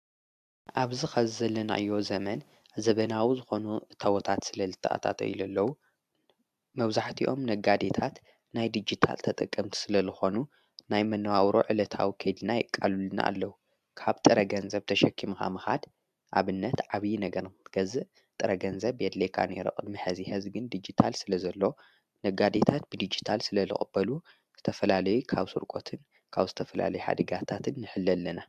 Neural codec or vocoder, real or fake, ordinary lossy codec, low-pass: none; real; AAC, 96 kbps; 14.4 kHz